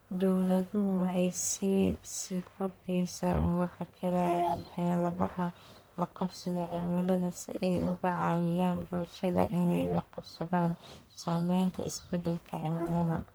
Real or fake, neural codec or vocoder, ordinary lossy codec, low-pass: fake; codec, 44.1 kHz, 1.7 kbps, Pupu-Codec; none; none